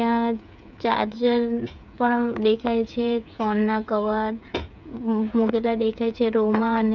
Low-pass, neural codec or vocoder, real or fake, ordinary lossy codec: 7.2 kHz; codec, 16 kHz, 8 kbps, FreqCodec, smaller model; fake; none